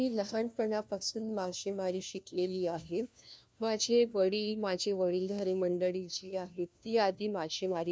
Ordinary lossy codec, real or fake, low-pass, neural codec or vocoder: none; fake; none; codec, 16 kHz, 1 kbps, FunCodec, trained on LibriTTS, 50 frames a second